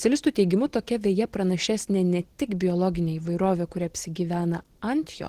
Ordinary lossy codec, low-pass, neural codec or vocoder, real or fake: Opus, 16 kbps; 14.4 kHz; none; real